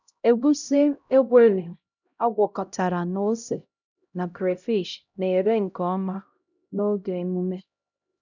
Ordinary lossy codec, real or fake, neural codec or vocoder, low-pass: none; fake; codec, 16 kHz, 0.5 kbps, X-Codec, HuBERT features, trained on LibriSpeech; 7.2 kHz